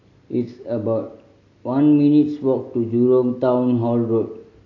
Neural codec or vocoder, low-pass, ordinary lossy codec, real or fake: none; 7.2 kHz; AAC, 32 kbps; real